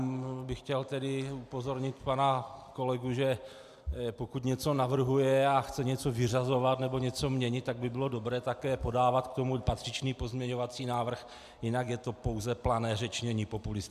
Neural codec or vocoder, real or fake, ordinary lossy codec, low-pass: none; real; AAC, 96 kbps; 14.4 kHz